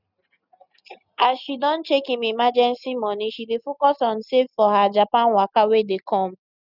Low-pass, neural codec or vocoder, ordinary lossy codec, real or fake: 5.4 kHz; none; none; real